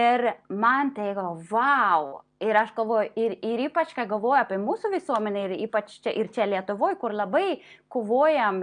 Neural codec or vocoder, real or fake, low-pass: none; real; 9.9 kHz